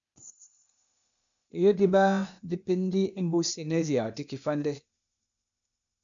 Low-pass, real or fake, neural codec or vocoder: 7.2 kHz; fake; codec, 16 kHz, 0.8 kbps, ZipCodec